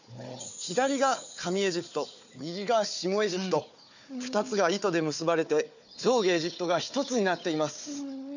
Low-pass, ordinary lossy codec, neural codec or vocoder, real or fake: 7.2 kHz; none; codec, 16 kHz, 4 kbps, FunCodec, trained on Chinese and English, 50 frames a second; fake